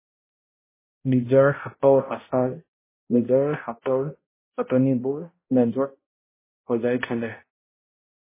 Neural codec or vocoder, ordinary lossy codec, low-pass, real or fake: codec, 16 kHz, 0.5 kbps, X-Codec, HuBERT features, trained on balanced general audio; MP3, 16 kbps; 3.6 kHz; fake